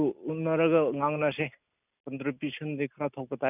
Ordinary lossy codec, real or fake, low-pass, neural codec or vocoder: none; real; 3.6 kHz; none